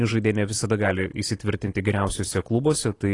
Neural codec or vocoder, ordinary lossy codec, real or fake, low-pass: none; AAC, 32 kbps; real; 10.8 kHz